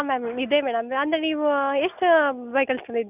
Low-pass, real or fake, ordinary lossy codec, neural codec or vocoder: 3.6 kHz; real; none; none